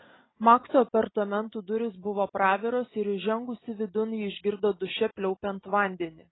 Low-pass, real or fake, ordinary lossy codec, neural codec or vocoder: 7.2 kHz; real; AAC, 16 kbps; none